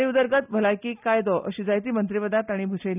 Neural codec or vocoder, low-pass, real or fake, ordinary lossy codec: none; 3.6 kHz; real; none